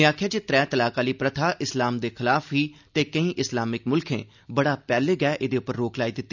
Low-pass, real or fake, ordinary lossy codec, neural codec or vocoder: 7.2 kHz; real; none; none